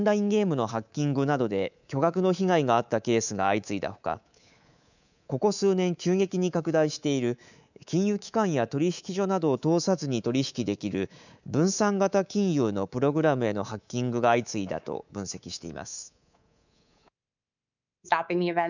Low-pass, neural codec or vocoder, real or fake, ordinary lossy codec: 7.2 kHz; codec, 24 kHz, 3.1 kbps, DualCodec; fake; none